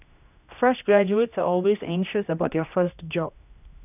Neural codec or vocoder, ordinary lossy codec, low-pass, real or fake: codec, 16 kHz, 1 kbps, X-Codec, HuBERT features, trained on general audio; none; 3.6 kHz; fake